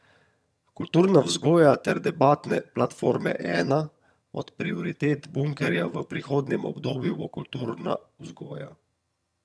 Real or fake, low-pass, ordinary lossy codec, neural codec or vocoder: fake; none; none; vocoder, 22.05 kHz, 80 mel bands, HiFi-GAN